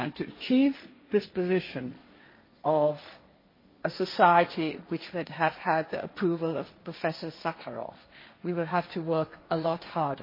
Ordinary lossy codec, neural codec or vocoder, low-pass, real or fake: MP3, 24 kbps; codec, 16 kHz, 1.1 kbps, Voila-Tokenizer; 5.4 kHz; fake